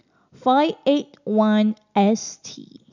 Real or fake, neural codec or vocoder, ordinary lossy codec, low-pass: real; none; none; 7.2 kHz